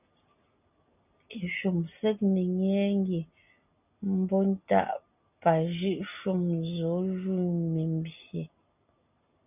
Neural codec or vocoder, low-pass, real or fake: none; 3.6 kHz; real